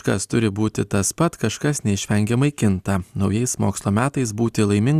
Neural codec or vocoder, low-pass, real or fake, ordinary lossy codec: none; 14.4 kHz; real; Opus, 64 kbps